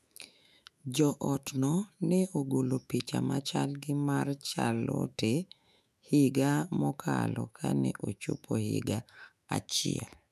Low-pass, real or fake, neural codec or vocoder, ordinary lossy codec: 14.4 kHz; fake; autoencoder, 48 kHz, 128 numbers a frame, DAC-VAE, trained on Japanese speech; none